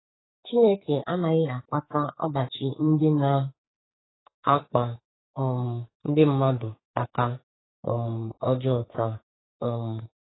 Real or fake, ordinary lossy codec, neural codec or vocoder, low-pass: fake; AAC, 16 kbps; codec, 44.1 kHz, 2.6 kbps, SNAC; 7.2 kHz